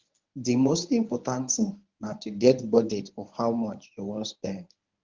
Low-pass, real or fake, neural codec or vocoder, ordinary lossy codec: 7.2 kHz; fake; codec, 24 kHz, 0.9 kbps, WavTokenizer, medium speech release version 1; Opus, 24 kbps